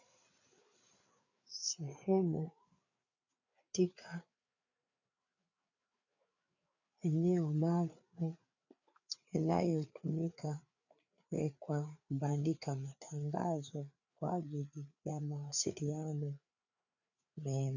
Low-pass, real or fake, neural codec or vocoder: 7.2 kHz; fake; codec, 16 kHz, 4 kbps, FreqCodec, larger model